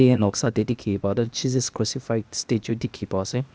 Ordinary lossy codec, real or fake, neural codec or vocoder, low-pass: none; fake; codec, 16 kHz, 0.8 kbps, ZipCodec; none